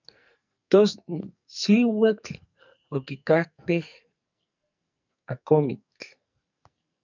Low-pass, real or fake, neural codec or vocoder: 7.2 kHz; fake; codec, 44.1 kHz, 2.6 kbps, SNAC